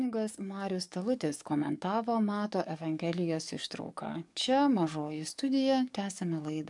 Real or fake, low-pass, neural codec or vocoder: fake; 10.8 kHz; codec, 44.1 kHz, 7.8 kbps, DAC